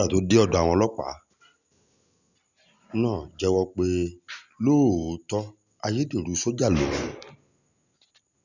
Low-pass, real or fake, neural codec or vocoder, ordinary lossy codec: 7.2 kHz; real; none; none